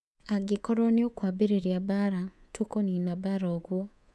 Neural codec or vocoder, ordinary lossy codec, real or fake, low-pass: codec, 24 kHz, 3.1 kbps, DualCodec; none; fake; none